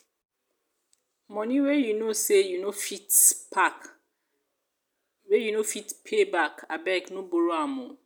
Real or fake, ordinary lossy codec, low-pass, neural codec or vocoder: real; none; none; none